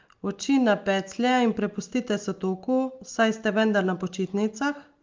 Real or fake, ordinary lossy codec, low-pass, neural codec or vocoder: real; Opus, 32 kbps; 7.2 kHz; none